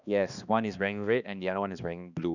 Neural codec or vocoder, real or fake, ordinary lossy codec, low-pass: codec, 16 kHz, 2 kbps, X-Codec, HuBERT features, trained on balanced general audio; fake; none; 7.2 kHz